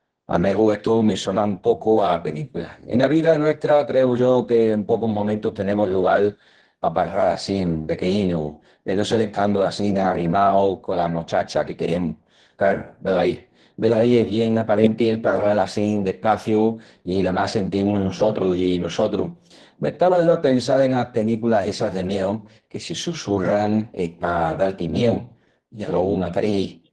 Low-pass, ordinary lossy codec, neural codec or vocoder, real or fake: 10.8 kHz; Opus, 16 kbps; codec, 24 kHz, 0.9 kbps, WavTokenizer, medium music audio release; fake